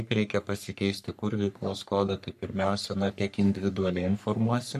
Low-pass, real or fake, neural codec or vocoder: 14.4 kHz; fake; codec, 44.1 kHz, 3.4 kbps, Pupu-Codec